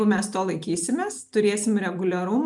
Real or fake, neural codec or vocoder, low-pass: real; none; 10.8 kHz